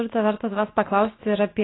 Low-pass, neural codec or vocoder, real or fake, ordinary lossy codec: 7.2 kHz; none; real; AAC, 16 kbps